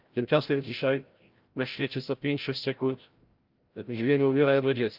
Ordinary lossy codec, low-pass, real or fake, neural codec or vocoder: Opus, 24 kbps; 5.4 kHz; fake; codec, 16 kHz, 0.5 kbps, FreqCodec, larger model